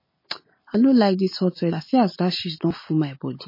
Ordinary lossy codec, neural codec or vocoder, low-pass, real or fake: MP3, 24 kbps; none; 5.4 kHz; real